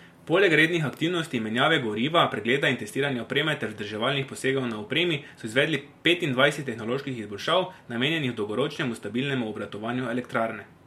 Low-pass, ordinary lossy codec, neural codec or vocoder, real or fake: 19.8 kHz; MP3, 64 kbps; none; real